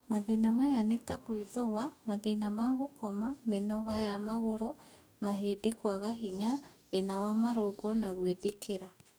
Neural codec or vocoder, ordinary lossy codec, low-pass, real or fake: codec, 44.1 kHz, 2.6 kbps, DAC; none; none; fake